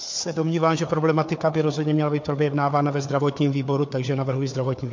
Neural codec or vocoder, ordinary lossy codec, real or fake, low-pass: codec, 16 kHz, 4 kbps, FunCodec, trained on Chinese and English, 50 frames a second; MP3, 48 kbps; fake; 7.2 kHz